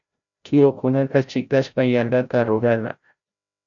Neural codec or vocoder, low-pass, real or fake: codec, 16 kHz, 0.5 kbps, FreqCodec, larger model; 7.2 kHz; fake